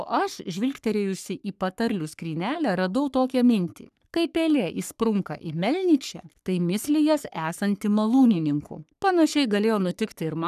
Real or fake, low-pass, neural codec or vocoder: fake; 14.4 kHz; codec, 44.1 kHz, 3.4 kbps, Pupu-Codec